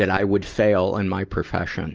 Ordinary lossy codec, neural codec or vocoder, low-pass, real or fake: Opus, 24 kbps; none; 7.2 kHz; real